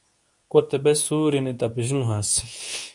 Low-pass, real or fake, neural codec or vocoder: 10.8 kHz; fake; codec, 24 kHz, 0.9 kbps, WavTokenizer, medium speech release version 2